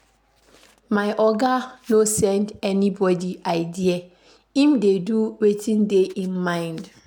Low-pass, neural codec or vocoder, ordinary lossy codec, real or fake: 19.8 kHz; none; none; real